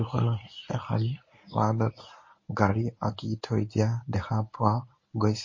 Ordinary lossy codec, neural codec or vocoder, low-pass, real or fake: MP3, 32 kbps; codec, 24 kHz, 0.9 kbps, WavTokenizer, medium speech release version 1; 7.2 kHz; fake